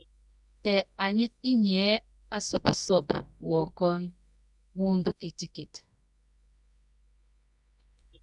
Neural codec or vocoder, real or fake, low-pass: codec, 24 kHz, 0.9 kbps, WavTokenizer, medium music audio release; fake; 10.8 kHz